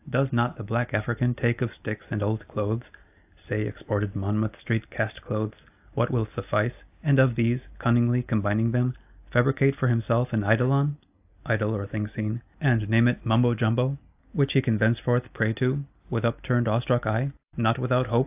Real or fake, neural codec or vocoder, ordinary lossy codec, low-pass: real; none; AAC, 32 kbps; 3.6 kHz